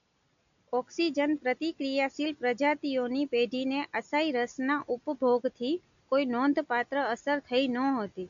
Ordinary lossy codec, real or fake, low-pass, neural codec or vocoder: none; real; 7.2 kHz; none